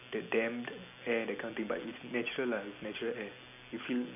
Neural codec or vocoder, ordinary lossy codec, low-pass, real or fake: none; none; 3.6 kHz; real